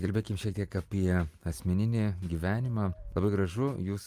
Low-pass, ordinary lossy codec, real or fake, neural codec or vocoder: 14.4 kHz; Opus, 32 kbps; real; none